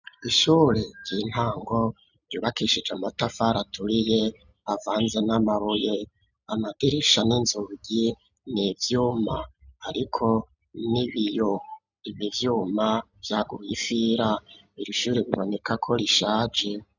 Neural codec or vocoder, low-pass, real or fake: none; 7.2 kHz; real